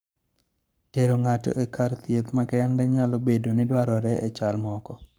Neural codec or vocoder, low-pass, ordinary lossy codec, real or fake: codec, 44.1 kHz, 7.8 kbps, Pupu-Codec; none; none; fake